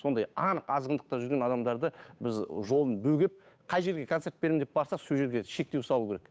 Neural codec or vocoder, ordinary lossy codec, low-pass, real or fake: codec, 16 kHz, 8 kbps, FunCodec, trained on Chinese and English, 25 frames a second; none; none; fake